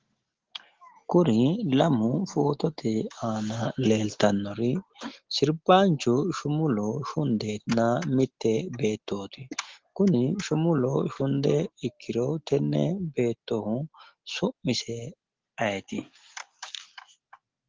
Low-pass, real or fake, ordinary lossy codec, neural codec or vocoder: 7.2 kHz; real; Opus, 16 kbps; none